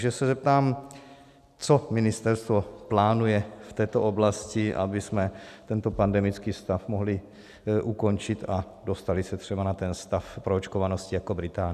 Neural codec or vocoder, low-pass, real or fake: none; 14.4 kHz; real